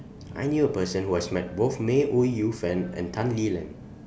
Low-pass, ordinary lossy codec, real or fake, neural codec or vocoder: none; none; real; none